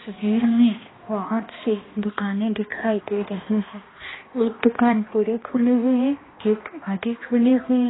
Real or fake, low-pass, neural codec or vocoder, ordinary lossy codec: fake; 7.2 kHz; codec, 16 kHz, 1 kbps, X-Codec, HuBERT features, trained on balanced general audio; AAC, 16 kbps